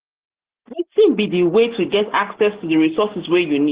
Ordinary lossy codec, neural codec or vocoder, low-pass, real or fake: Opus, 16 kbps; none; 3.6 kHz; real